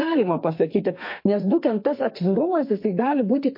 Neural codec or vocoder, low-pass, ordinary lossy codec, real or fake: codec, 32 kHz, 1.9 kbps, SNAC; 5.4 kHz; MP3, 32 kbps; fake